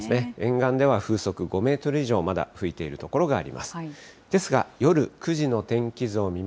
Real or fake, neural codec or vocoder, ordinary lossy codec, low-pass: real; none; none; none